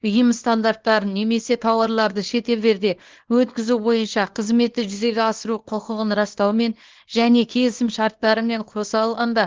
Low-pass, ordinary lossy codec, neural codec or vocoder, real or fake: 7.2 kHz; Opus, 16 kbps; codec, 24 kHz, 0.9 kbps, WavTokenizer, small release; fake